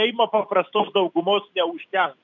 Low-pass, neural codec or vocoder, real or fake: 7.2 kHz; none; real